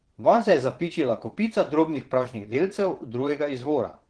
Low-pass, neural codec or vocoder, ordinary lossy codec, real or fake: 9.9 kHz; vocoder, 22.05 kHz, 80 mel bands, Vocos; Opus, 16 kbps; fake